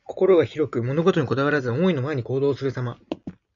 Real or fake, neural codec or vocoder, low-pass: real; none; 7.2 kHz